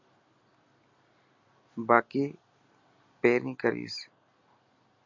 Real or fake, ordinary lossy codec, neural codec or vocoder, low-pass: real; MP3, 48 kbps; none; 7.2 kHz